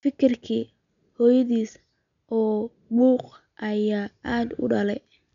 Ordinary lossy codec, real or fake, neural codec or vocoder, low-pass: none; real; none; 7.2 kHz